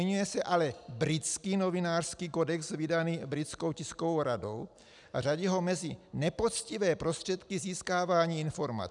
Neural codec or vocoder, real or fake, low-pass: none; real; 10.8 kHz